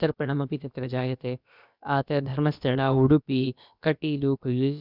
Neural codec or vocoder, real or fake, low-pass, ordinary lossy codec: codec, 16 kHz, about 1 kbps, DyCAST, with the encoder's durations; fake; 5.4 kHz; none